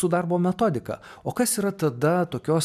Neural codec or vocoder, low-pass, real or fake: none; 14.4 kHz; real